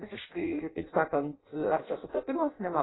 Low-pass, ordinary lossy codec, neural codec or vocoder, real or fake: 7.2 kHz; AAC, 16 kbps; codec, 16 kHz in and 24 kHz out, 0.6 kbps, FireRedTTS-2 codec; fake